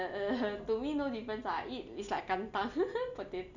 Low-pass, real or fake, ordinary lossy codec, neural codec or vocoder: 7.2 kHz; real; none; none